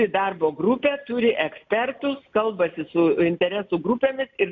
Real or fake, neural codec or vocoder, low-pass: real; none; 7.2 kHz